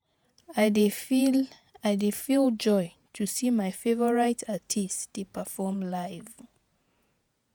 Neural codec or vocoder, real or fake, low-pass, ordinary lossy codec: vocoder, 48 kHz, 128 mel bands, Vocos; fake; none; none